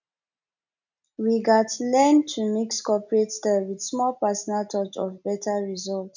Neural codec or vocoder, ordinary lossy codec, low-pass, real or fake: none; none; 7.2 kHz; real